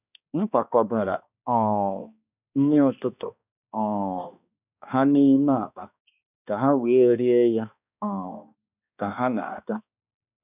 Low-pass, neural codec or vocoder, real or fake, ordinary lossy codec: 3.6 kHz; codec, 16 kHz, 2 kbps, X-Codec, HuBERT features, trained on balanced general audio; fake; none